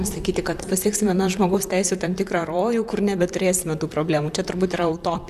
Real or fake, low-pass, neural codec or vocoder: fake; 14.4 kHz; vocoder, 44.1 kHz, 128 mel bands, Pupu-Vocoder